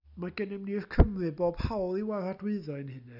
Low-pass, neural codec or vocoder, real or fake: 5.4 kHz; none; real